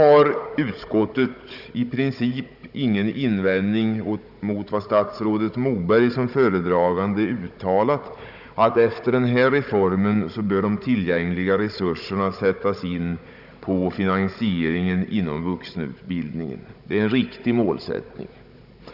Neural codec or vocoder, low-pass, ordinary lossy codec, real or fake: codec, 16 kHz, 16 kbps, FunCodec, trained on Chinese and English, 50 frames a second; 5.4 kHz; none; fake